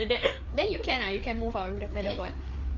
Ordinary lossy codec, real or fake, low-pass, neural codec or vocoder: AAC, 48 kbps; fake; 7.2 kHz; codec, 16 kHz, 4 kbps, FreqCodec, larger model